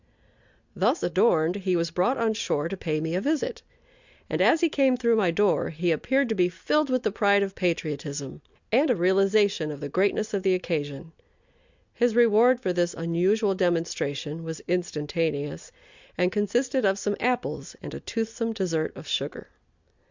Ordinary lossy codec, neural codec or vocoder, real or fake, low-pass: Opus, 64 kbps; none; real; 7.2 kHz